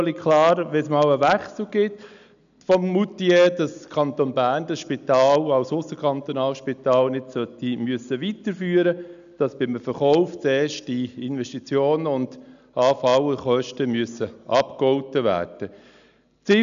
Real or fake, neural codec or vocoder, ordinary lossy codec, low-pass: real; none; none; 7.2 kHz